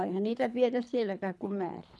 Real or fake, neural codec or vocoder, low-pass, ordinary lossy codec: fake; codec, 24 kHz, 3 kbps, HILCodec; none; none